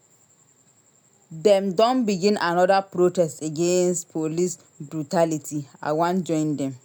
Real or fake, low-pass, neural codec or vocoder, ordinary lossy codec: real; none; none; none